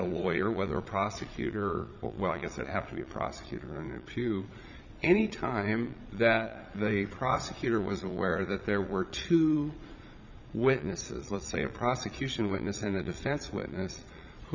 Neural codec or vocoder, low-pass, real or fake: vocoder, 22.05 kHz, 80 mel bands, Vocos; 7.2 kHz; fake